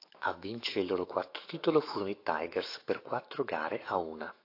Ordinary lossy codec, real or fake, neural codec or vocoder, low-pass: AAC, 32 kbps; fake; autoencoder, 48 kHz, 128 numbers a frame, DAC-VAE, trained on Japanese speech; 5.4 kHz